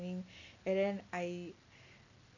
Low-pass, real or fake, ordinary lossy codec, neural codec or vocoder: 7.2 kHz; real; none; none